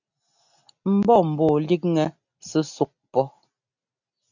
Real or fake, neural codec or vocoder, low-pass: real; none; 7.2 kHz